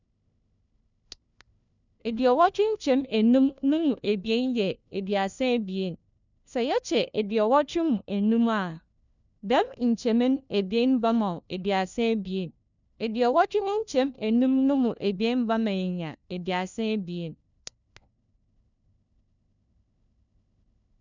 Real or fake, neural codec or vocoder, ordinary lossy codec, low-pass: fake; codec, 16 kHz, 1 kbps, FunCodec, trained on LibriTTS, 50 frames a second; none; 7.2 kHz